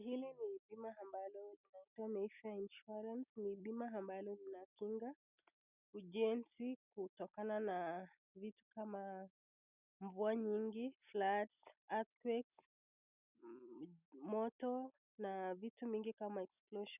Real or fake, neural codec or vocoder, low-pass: real; none; 3.6 kHz